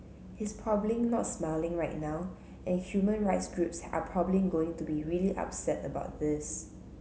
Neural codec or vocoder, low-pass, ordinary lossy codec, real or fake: none; none; none; real